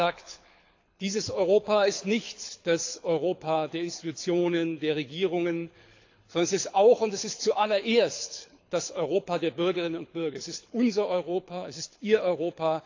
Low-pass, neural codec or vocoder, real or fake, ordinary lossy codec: 7.2 kHz; codec, 24 kHz, 6 kbps, HILCodec; fake; AAC, 48 kbps